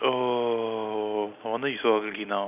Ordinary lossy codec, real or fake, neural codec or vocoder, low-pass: none; real; none; 3.6 kHz